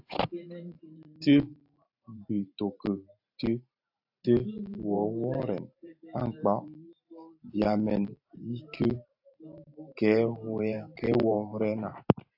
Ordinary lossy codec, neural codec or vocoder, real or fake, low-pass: AAC, 48 kbps; vocoder, 44.1 kHz, 128 mel bands every 512 samples, BigVGAN v2; fake; 5.4 kHz